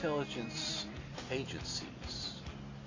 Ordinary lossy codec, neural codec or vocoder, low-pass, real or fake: AAC, 32 kbps; none; 7.2 kHz; real